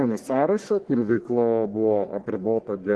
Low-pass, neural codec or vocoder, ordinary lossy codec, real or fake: 10.8 kHz; codec, 44.1 kHz, 1.7 kbps, Pupu-Codec; Opus, 16 kbps; fake